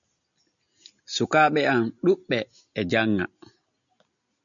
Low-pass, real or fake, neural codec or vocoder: 7.2 kHz; real; none